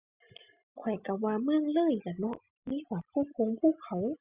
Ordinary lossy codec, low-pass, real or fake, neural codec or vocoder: Opus, 64 kbps; 3.6 kHz; real; none